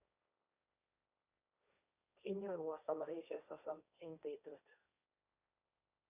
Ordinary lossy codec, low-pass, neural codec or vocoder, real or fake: none; 3.6 kHz; codec, 16 kHz, 1.1 kbps, Voila-Tokenizer; fake